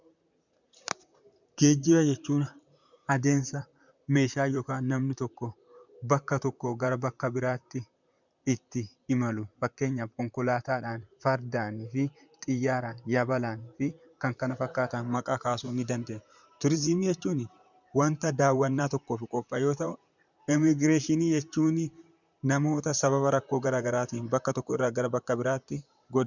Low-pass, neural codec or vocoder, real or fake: 7.2 kHz; vocoder, 44.1 kHz, 128 mel bands, Pupu-Vocoder; fake